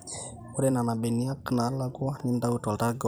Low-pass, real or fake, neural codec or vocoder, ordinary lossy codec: none; fake; vocoder, 44.1 kHz, 128 mel bands every 256 samples, BigVGAN v2; none